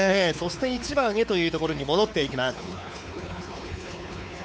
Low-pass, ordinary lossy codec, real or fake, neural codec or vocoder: none; none; fake; codec, 16 kHz, 4 kbps, X-Codec, WavLM features, trained on Multilingual LibriSpeech